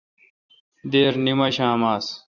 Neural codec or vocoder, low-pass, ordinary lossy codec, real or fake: vocoder, 44.1 kHz, 128 mel bands every 256 samples, BigVGAN v2; 7.2 kHz; Opus, 64 kbps; fake